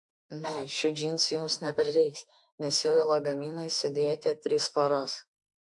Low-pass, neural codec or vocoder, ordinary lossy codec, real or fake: 10.8 kHz; autoencoder, 48 kHz, 32 numbers a frame, DAC-VAE, trained on Japanese speech; AAC, 64 kbps; fake